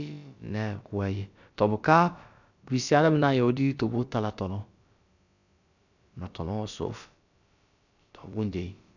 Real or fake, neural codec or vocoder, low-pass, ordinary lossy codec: fake; codec, 16 kHz, about 1 kbps, DyCAST, with the encoder's durations; 7.2 kHz; none